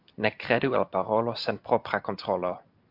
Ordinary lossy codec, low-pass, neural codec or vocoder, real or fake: MP3, 48 kbps; 5.4 kHz; none; real